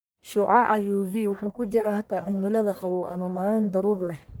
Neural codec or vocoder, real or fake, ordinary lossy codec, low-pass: codec, 44.1 kHz, 1.7 kbps, Pupu-Codec; fake; none; none